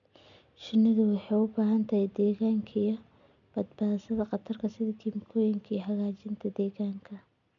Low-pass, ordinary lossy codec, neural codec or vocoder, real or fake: 7.2 kHz; MP3, 96 kbps; none; real